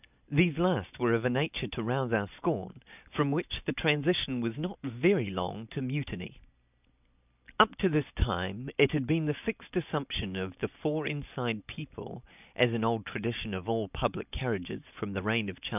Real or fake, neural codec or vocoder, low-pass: real; none; 3.6 kHz